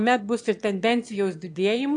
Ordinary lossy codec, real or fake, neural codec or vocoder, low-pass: AAC, 64 kbps; fake; autoencoder, 22.05 kHz, a latent of 192 numbers a frame, VITS, trained on one speaker; 9.9 kHz